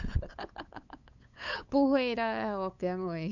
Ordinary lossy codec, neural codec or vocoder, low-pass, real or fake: none; codec, 16 kHz, 8 kbps, FunCodec, trained on LibriTTS, 25 frames a second; 7.2 kHz; fake